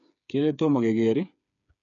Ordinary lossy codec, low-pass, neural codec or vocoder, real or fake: none; 7.2 kHz; codec, 16 kHz, 8 kbps, FreqCodec, smaller model; fake